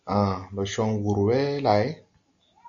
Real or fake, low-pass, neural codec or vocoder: real; 7.2 kHz; none